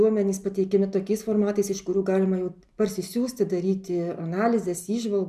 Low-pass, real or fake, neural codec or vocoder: 14.4 kHz; real; none